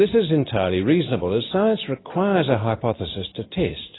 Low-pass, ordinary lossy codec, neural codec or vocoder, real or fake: 7.2 kHz; AAC, 16 kbps; none; real